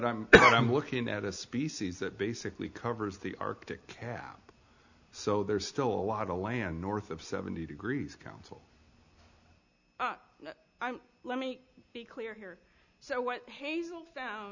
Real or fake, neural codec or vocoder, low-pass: real; none; 7.2 kHz